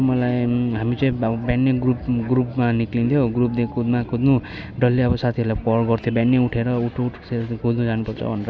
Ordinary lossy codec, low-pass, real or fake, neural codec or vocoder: none; none; real; none